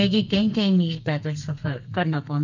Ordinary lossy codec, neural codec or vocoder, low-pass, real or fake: none; codec, 32 kHz, 1.9 kbps, SNAC; 7.2 kHz; fake